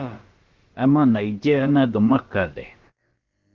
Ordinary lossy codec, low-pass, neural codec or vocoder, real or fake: Opus, 16 kbps; 7.2 kHz; codec, 16 kHz, about 1 kbps, DyCAST, with the encoder's durations; fake